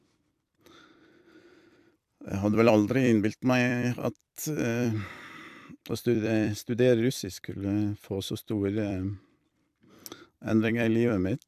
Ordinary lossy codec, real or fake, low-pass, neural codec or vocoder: AAC, 96 kbps; fake; 14.4 kHz; vocoder, 44.1 kHz, 128 mel bands every 256 samples, BigVGAN v2